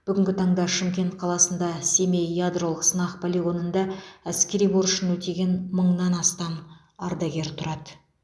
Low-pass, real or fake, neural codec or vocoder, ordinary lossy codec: none; real; none; none